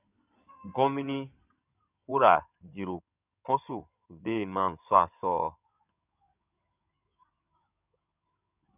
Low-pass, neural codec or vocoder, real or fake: 3.6 kHz; codec, 16 kHz in and 24 kHz out, 2.2 kbps, FireRedTTS-2 codec; fake